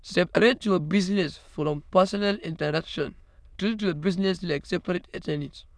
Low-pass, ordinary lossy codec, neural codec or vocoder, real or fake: none; none; autoencoder, 22.05 kHz, a latent of 192 numbers a frame, VITS, trained on many speakers; fake